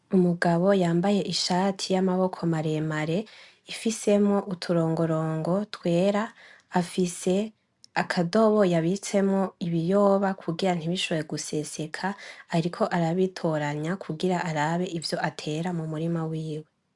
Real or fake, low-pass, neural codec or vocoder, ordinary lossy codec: real; 10.8 kHz; none; MP3, 96 kbps